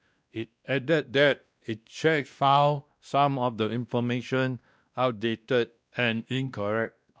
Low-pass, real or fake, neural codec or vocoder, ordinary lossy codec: none; fake; codec, 16 kHz, 1 kbps, X-Codec, WavLM features, trained on Multilingual LibriSpeech; none